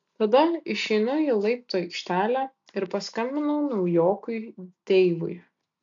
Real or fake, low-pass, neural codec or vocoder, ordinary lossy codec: real; 7.2 kHz; none; AAC, 64 kbps